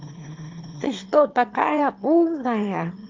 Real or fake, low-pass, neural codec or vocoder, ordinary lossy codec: fake; 7.2 kHz; autoencoder, 22.05 kHz, a latent of 192 numbers a frame, VITS, trained on one speaker; Opus, 32 kbps